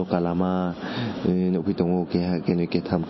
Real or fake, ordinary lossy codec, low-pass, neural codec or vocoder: real; MP3, 24 kbps; 7.2 kHz; none